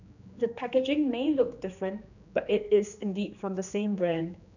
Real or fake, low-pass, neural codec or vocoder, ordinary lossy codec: fake; 7.2 kHz; codec, 16 kHz, 2 kbps, X-Codec, HuBERT features, trained on general audio; none